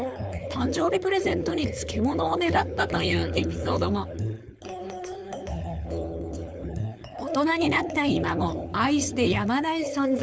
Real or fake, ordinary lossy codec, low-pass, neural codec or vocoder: fake; none; none; codec, 16 kHz, 4.8 kbps, FACodec